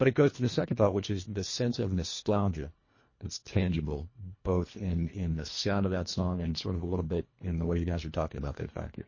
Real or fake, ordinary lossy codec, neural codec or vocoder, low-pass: fake; MP3, 32 kbps; codec, 24 kHz, 1.5 kbps, HILCodec; 7.2 kHz